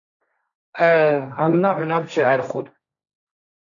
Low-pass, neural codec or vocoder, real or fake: 7.2 kHz; codec, 16 kHz, 1.1 kbps, Voila-Tokenizer; fake